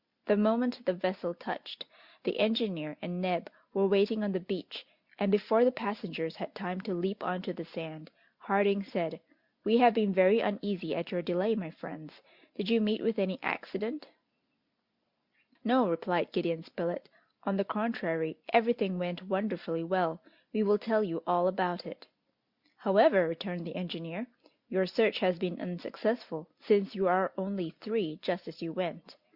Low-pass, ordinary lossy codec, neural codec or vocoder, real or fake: 5.4 kHz; Opus, 64 kbps; none; real